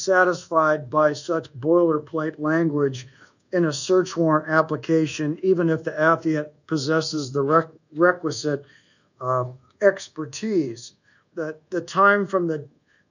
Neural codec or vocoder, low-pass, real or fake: codec, 24 kHz, 1.2 kbps, DualCodec; 7.2 kHz; fake